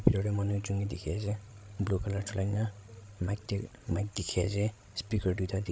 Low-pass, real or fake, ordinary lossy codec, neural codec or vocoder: none; fake; none; codec, 16 kHz, 16 kbps, FreqCodec, larger model